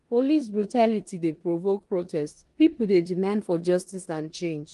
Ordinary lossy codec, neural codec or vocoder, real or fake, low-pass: Opus, 24 kbps; codec, 16 kHz in and 24 kHz out, 0.9 kbps, LongCat-Audio-Codec, four codebook decoder; fake; 10.8 kHz